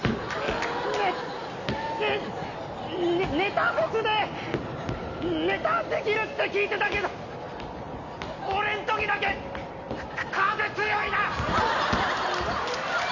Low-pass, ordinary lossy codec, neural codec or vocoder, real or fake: 7.2 kHz; none; none; real